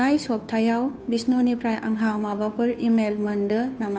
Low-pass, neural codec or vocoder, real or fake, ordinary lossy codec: none; codec, 16 kHz, 2 kbps, FunCodec, trained on Chinese and English, 25 frames a second; fake; none